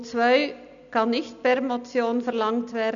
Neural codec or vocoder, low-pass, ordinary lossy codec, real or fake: none; 7.2 kHz; none; real